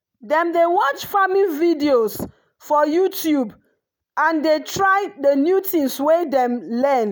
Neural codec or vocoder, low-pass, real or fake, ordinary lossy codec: none; none; real; none